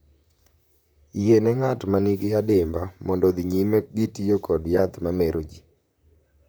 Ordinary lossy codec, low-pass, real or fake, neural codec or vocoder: none; none; fake; vocoder, 44.1 kHz, 128 mel bands, Pupu-Vocoder